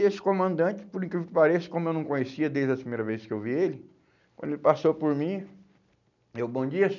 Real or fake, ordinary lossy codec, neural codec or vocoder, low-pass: real; none; none; 7.2 kHz